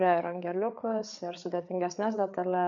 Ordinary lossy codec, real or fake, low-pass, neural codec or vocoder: MP3, 96 kbps; fake; 7.2 kHz; codec, 16 kHz, 4 kbps, FreqCodec, larger model